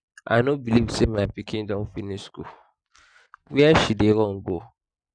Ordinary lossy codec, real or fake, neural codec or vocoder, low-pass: none; real; none; 9.9 kHz